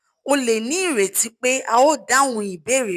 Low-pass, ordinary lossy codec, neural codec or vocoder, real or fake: 14.4 kHz; none; none; real